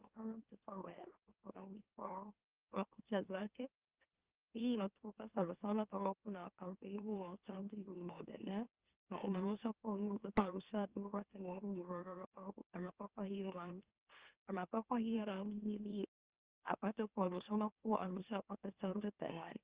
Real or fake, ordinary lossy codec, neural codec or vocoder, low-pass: fake; Opus, 24 kbps; autoencoder, 44.1 kHz, a latent of 192 numbers a frame, MeloTTS; 3.6 kHz